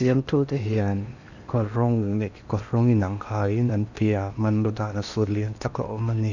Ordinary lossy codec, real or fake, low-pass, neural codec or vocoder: none; fake; 7.2 kHz; codec, 16 kHz in and 24 kHz out, 0.8 kbps, FocalCodec, streaming, 65536 codes